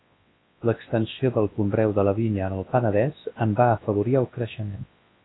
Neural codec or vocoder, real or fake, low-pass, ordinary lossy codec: codec, 24 kHz, 0.9 kbps, WavTokenizer, large speech release; fake; 7.2 kHz; AAC, 16 kbps